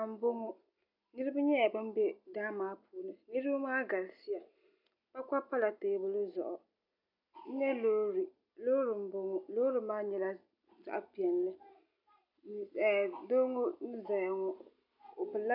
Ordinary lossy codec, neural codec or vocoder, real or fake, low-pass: MP3, 48 kbps; none; real; 5.4 kHz